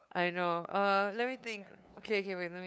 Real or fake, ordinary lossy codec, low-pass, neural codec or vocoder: fake; none; none; codec, 16 kHz, 2 kbps, FunCodec, trained on LibriTTS, 25 frames a second